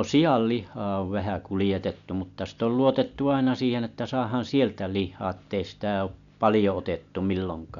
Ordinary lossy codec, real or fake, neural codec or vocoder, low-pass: none; real; none; 7.2 kHz